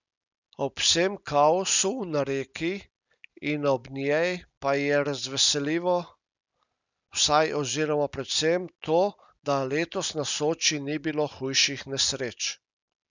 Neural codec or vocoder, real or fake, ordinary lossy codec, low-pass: none; real; none; 7.2 kHz